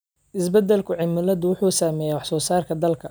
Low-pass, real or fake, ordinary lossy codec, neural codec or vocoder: none; real; none; none